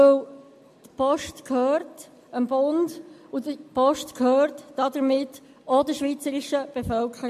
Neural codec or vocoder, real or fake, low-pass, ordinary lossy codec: none; real; 14.4 kHz; MP3, 64 kbps